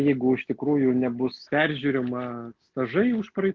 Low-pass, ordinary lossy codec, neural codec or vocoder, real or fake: 7.2 kHz; Opus, 16 kbps; none; real